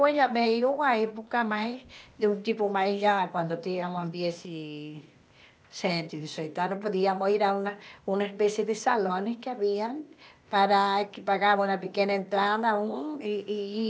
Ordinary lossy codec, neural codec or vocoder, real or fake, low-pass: none; codec, 16 kHz, 0.8 kbps, ZipCodec; fake; none